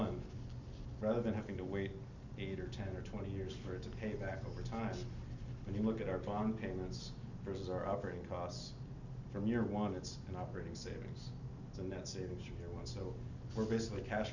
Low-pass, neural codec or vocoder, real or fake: 7.2 kHz; none; real